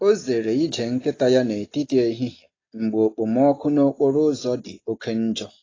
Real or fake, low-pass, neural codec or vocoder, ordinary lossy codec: real; 7.2 kHz; none; AAC, 32 kbps